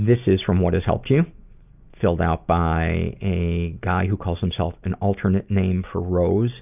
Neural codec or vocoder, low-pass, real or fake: none; 3.6 kHz; real